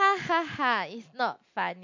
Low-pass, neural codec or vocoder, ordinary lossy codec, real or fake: 7.2 kHz; none; MP3, 48 kbps; real